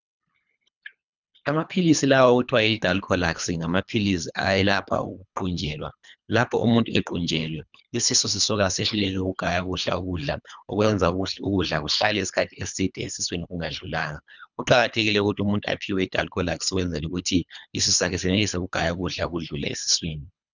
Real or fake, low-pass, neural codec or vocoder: fake; 7.2 kHz; codec, 24 kHz, 3 kbps, HILCodec